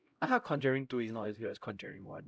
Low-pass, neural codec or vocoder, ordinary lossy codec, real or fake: none; codec, 16 kHz, 0.5 kbps, X-Codec, HuBERT features, trained on LibriSpeech; none; fake